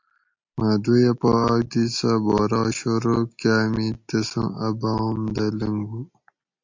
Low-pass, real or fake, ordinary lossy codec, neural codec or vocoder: 7.2 kHz; real; MP3, 48 kbps; none